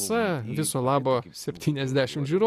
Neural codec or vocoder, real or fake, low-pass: none; real; 14.4 kHz